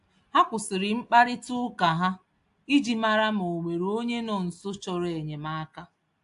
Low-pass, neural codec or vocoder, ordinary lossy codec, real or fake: 10.8 kHz; none; AAC, 64 kbps; real